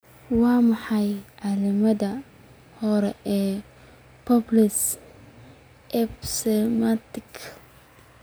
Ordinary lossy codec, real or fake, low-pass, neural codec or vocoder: none; real; none; none